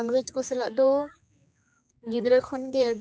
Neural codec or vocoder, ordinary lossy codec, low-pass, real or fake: codec, 16 kHz, 2 kbps, X-Codec, HuBERT features, trained on general audio; none; none; fake